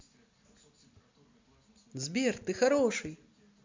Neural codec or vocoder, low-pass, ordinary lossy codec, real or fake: none; 7.2 kHz; MP3, 64 kbps; real